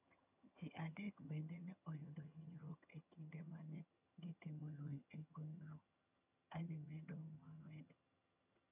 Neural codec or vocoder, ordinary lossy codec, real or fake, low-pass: vocoder, 22.05 kHz, 80 mel bands, HiFi-GAN; none; fake; 3.6 kHz